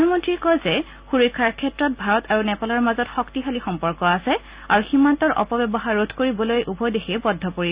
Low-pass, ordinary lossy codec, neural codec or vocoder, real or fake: 3.6 kHz; Opus, 64 kbps; none; real